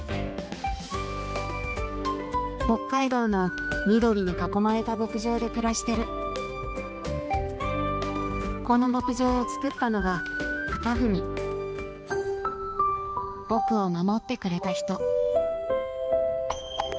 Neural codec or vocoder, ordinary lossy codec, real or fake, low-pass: codec, 16 kHz, 2 kbps, X-Codec, HuBERT features, trained on balanced general audio; none; fake; none